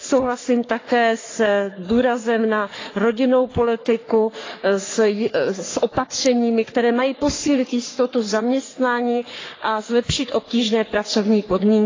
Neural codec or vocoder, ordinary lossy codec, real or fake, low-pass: codec, 44.1 kHz, 3.4 kbps, Pupu-Codec; AAC, 32 kbps; fake; 7.2 kHz